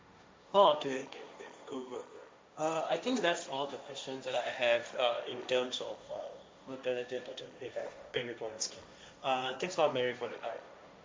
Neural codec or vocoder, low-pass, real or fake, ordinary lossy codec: codec, 16 kHz, 1.1 kbps, Voila-Tokenizer; none; fake; none